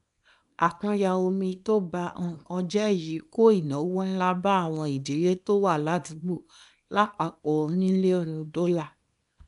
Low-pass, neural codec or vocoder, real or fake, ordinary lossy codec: 10.8 kHz; codec, 24 kHz, 0.9 kbps, WavTokenizer, small release; fake; none